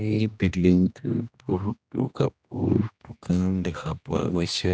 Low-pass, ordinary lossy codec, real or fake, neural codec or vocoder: none; none; fake; codec, 16 kHz, 1 kbps, X-Codec, HuBERT features, trained on general audio